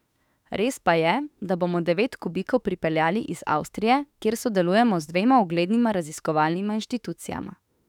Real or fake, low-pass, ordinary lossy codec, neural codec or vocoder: fake; 19.8 kHz; none; autoencoder, 48 kHz, 32 numbers a frame, DAC-VAE, trained on Japanese speech